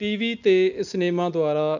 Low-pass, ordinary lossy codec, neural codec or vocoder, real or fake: 7.2 kHz; none; none; real